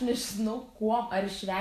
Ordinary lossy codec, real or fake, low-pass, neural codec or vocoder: AAC, 64 kbps; fake; 14.4 kHz; vocoder, 44.1 kHz, 128 mel bands every 512 samples, BigVGAN v2